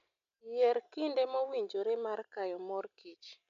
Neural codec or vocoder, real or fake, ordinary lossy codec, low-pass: none; real; none; 7.2 kHz